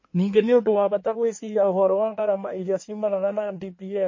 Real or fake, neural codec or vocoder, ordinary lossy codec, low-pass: fake; codec, 16 kHz in and 24 kHz out, 1.1 kbps, FireRedTTS-2 codec; MP3, 32 kbps; 7.2 kHz